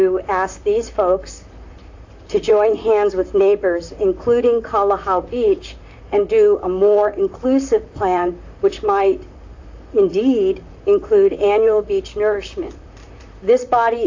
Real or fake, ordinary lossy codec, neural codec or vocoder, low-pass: fake; AAC, 48 kbps; vocoder, 44.1 kHz, 128 mel bands, Pupu-Vocoder; 7.2 kHz